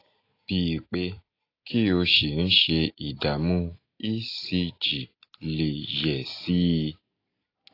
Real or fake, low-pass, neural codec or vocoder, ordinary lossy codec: real; 5.4 kHz; none; AAC, 32 kbps